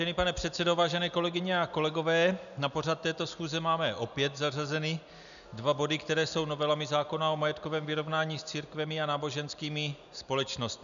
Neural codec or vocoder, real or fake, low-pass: none; real; 7.2 kHz